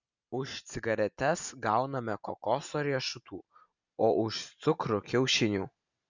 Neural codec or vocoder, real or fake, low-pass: none; real; 7.2 kHz